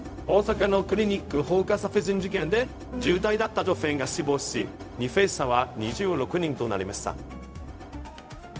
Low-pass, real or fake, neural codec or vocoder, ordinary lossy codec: none; fake; codec, 16 kHz, 0.4 kbps, LongCat-Audio-Codec; none